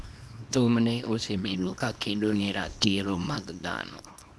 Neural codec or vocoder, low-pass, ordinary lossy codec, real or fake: codec, 24 kHz, 0.9 kbps, WavTokenizer, small release; none; none; fake